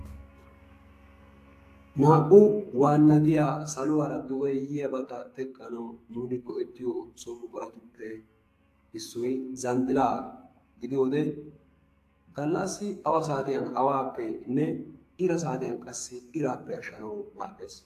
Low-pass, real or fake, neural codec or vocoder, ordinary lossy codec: 14.4 kHz; fake; codec, 44.1 kHz, 2.6 kbps, SNAC; AAC, 64 kbps